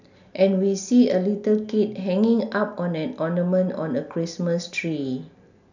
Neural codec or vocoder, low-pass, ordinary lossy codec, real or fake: none; 7.2 kHz; none; real